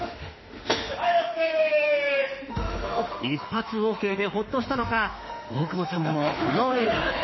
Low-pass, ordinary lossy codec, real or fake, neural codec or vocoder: 7.2 kHz; MP3, 24 kbps; fake; autoencoder, 48 kHz, 32 numbers a frame, DAC-VAE, trained on Japanese speech